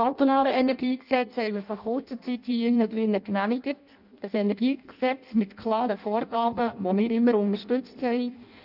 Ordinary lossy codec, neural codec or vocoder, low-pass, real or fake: none; codec, 16 kHz in and 24 kHz out, 0.6 kbps, FireRedTTS-2 codec; 5.4 kHz; fake